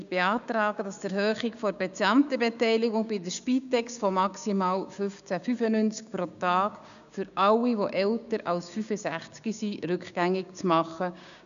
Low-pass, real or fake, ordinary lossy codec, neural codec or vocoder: 7.2 kHz; fake; none; codec, 16 kHz, 6 kbps, DAC